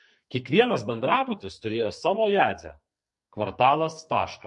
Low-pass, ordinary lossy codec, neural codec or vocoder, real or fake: 10.8 kHz; MP3, 48 kbps; codec, 44.1 kHz, 2.6 kbps, SNAC; fake